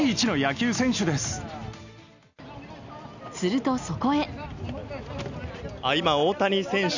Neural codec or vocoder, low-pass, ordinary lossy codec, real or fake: none; 7.2 kHz; none; real